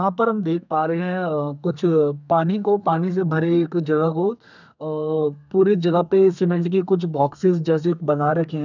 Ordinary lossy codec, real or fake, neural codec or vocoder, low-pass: none; fake; codec, 32 kHz, 1.9 kbps, SNAC; 7.2 kHz